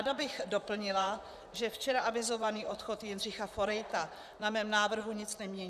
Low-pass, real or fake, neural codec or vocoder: 14.4 kHz; fake; vocoder, 44.1 kHz, 128 mel bands, Pupu-Vocoder